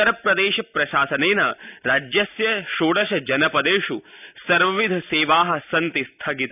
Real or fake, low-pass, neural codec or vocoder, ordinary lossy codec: real; 3.6 kHz; none; none